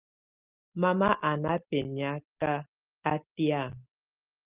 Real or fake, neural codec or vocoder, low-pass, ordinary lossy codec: real; none; 3.6 kHz; Opus, 32 kbps